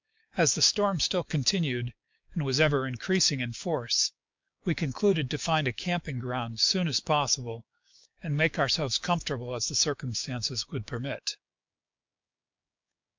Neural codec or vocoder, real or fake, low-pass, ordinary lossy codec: codec, 16 kHz, 6 kbps, DAC; fake; 7.2 kHz; MP3, 64 kbps